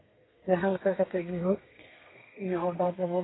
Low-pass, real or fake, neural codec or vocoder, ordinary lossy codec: 7.2 kHz; fake; codec, 32 kHz, 1.9 kbps, SNAC; AAC, 16 kbps